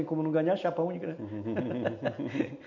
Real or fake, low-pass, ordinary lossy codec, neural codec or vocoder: real; 7.2 kHz; none; none